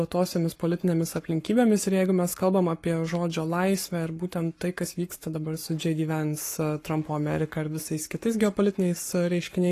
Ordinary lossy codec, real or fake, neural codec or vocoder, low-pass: AAC, 48 kbps; fake; codec, 44.1 kHz, 7.8 kbps, Pupu-Codec; 14.4 kHz